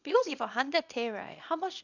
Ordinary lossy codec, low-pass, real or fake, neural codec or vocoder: none; 7.2 kHz; fake; codec, 24 kHz, 0.9 kbps, WavTokenizer, small release